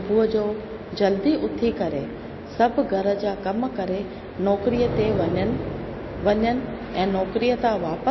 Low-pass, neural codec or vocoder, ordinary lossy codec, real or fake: 7.2 kHz; none; MP3, 24 kbps; real